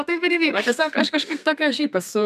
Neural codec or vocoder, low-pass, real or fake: codec, 32 kHz, 1.9 kbps, SNAC; 14.4 kHz; fake